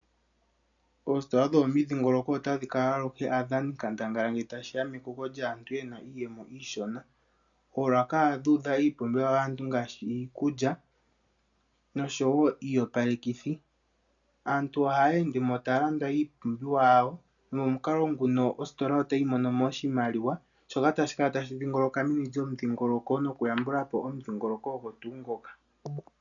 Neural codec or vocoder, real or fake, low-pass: none; real; 7.2 kHz